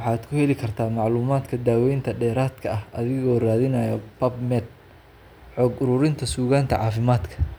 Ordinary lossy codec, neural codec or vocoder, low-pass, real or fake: none; none; none; real